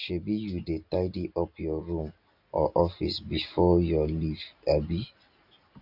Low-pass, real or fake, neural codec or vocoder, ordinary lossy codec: 5.4 kHz; real; none; none